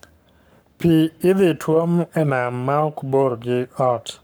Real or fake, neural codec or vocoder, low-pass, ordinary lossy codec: fake; codec, 44.1 kHz, 7.8 kbps, Pupu-Codec; none; none